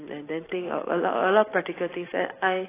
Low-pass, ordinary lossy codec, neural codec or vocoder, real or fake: 3.6 kHz; AAC, 16 kbps; vocoder, 44.1 kHz, 128 mel bands every 256 samples, BigVGAN v2; fake